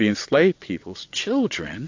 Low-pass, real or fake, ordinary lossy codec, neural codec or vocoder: 7.2 kHz; fake; AAC, 48 kbps; vocoder, 22.05 kHz, 80 mel bands, WaveNeXt